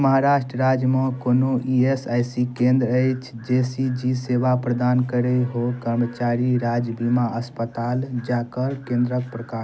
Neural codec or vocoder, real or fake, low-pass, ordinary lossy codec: none; real; none; none